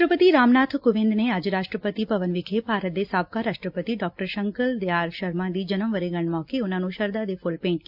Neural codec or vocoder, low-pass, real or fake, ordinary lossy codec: none; 5.4 kHz; real; AAC, 48 kbps